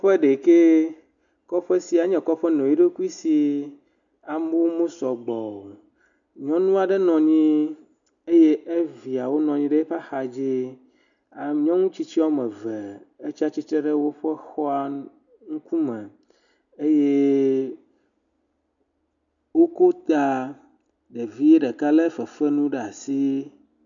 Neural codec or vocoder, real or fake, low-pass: none; real; 7.2 kHz